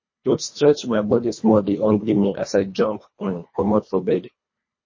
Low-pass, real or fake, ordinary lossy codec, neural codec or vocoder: 7.2 kHz; fake; MP3, 32 kbps; codec, 24 kHz, 1.5 kbps, HILCodec